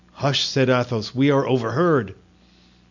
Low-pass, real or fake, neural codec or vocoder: 7.2 kHz; real; none